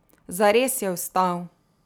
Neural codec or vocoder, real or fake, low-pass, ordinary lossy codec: none; real; none; none